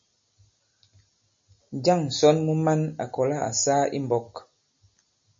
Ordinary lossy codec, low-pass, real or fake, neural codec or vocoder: MP3, 32 kbps; 7.2 kHz; real; none